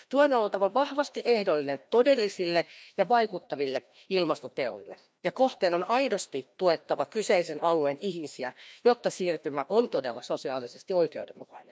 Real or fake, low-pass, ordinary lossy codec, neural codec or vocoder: fake; none; none; codec, 16 kHz, 1 kbps, FreqCodec, larger model